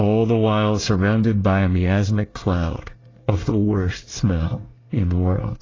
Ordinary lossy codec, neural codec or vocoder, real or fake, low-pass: AAC, 32 kbps; codec, 24 kHz, 1 kbps, SNAC; fake; 7.2 kHz